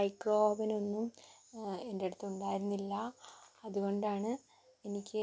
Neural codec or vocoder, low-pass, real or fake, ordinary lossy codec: none; none; real; none